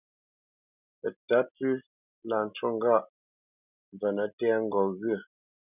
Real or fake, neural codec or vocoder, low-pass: real; none; 3.6 kHz